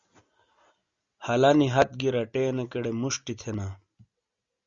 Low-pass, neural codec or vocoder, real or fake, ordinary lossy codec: 7.2 kHz; none; real; Opus, 64 kbps